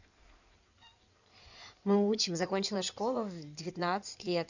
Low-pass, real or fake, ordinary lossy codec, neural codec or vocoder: 7.2 kHz; fake; none; codec, 16 kHz in and 24 kHz out, 2.2 kbps, FireRedTTS-2 codec